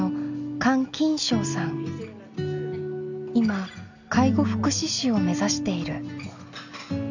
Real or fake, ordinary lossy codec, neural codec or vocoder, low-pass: real; none; none; 7.2 kHz